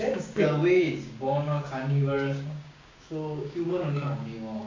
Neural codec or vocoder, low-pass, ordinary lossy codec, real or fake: codec, 16 kHz, 6 kbps, DAC; 7.2 kHz; AAC, 32 kbps; fake